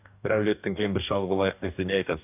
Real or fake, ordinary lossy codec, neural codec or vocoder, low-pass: fake; none; codec, 44.1 kHz, 2.6 kbps, DAC; 3.6 kHz